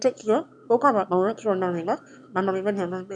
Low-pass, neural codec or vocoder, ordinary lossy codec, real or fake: 9.9 kHz; autoencoder, 22.05 kHz, a latent of 192 numbers a frame, VITS, trained on one speaker; none; fake